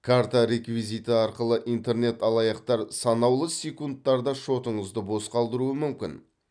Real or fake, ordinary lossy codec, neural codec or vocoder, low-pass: real; none; none; 9.9 kHz